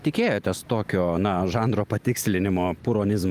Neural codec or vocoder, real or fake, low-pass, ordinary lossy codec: none; real; 14.4 kHz; Opus, 32 kbps